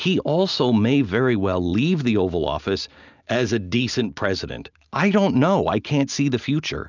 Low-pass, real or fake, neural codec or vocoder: 7.2 kHz; real; none